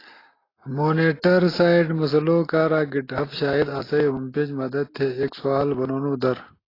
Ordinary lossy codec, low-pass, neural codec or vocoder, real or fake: AAC, 24 kbps; 5.4 kHz; none; real